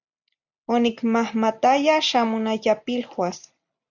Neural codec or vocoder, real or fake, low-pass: none; real; 7.2 kHz